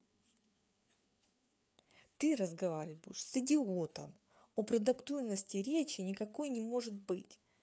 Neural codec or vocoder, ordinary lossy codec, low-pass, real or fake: codec, 16 kHz, 4 kbps, FreqCodec, larger model; none; none; fake